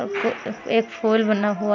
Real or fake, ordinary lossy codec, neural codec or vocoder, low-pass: real; none; none; 7.2 kHz